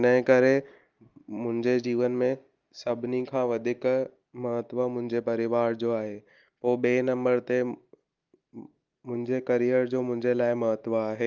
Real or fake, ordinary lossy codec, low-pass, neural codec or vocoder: real; Opus, 32 kbps; 7.2 kHz; none